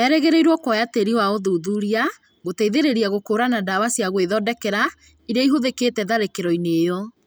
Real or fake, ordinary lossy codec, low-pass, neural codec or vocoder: real; none; none; none